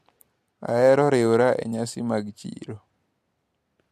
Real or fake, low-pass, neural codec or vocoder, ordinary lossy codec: real; 14.4 kHz; none; MP3, 64 kbps